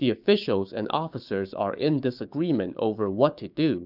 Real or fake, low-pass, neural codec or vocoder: fake; 5.4 kHz; codec, 16 kHz, 6 kbps, DAC